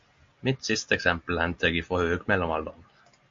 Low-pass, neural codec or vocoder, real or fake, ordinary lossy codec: 7.2 kHz; none; real; MP3, 48 kbps